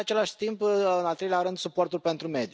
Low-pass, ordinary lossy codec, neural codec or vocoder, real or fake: none; none; none; real